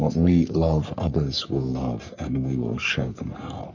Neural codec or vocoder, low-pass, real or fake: codec, 44.1 kHz, 3.4 kbps, Pupu-Codec; 7.2 kHz; fake